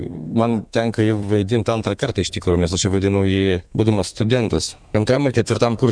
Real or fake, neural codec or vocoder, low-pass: fake; codec, 32 kHz, 1.9 kbps, SNAC; 9.9 kHz